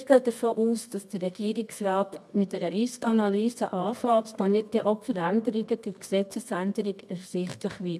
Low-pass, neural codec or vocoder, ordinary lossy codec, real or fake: none; codec, 24 kHz, 0.9 kbps, WavTokenizer, medium music audio release; none; fake